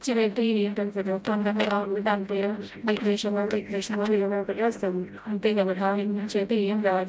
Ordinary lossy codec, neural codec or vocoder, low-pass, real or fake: none; codec, 16 kHz, 0.5 kbps, FreqCodec, smaller model; none; fake